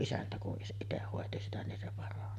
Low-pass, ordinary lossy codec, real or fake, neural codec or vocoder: 14.4 kHz; none; real; none